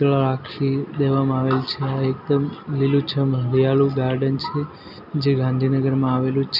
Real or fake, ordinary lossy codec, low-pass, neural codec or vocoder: real; none; 5.4 kHz; none